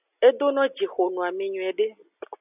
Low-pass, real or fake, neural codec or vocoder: 3.6 kHz; real; none